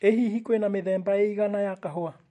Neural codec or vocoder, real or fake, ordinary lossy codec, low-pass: none; real; MP3, 48 kbps; 14.4 kHz